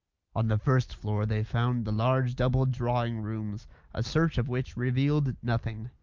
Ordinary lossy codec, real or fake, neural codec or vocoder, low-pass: Opus, 32 kbps; real; none; 7.2 kHz